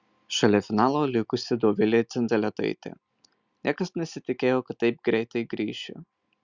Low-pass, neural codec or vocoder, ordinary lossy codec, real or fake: 7.2 kHz; none; Opus, 64 kbps; real